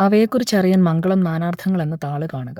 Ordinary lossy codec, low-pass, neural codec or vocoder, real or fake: none; 19.8 kHz; codec, 44.1 kHz, 7.8 kbps, Pupu-Codec; fake